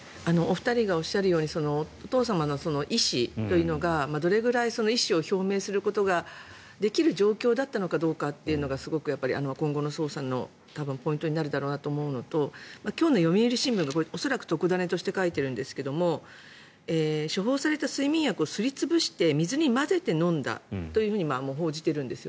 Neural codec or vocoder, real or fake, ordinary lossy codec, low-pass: none; real; none; none